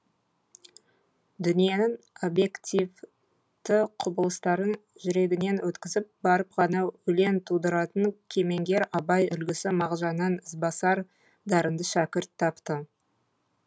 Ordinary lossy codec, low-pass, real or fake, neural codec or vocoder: none; none; real; none